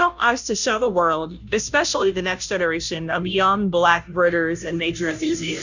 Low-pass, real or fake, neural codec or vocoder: 7.2 kHz; fake; codec, 16 kHz, 0.5 kbps, FunCodec, trained on Chinese and English, 25 frames a second